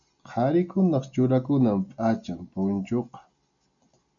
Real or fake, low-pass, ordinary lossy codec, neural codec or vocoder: real; 7.2 kHz; MP3, 96 kbps; none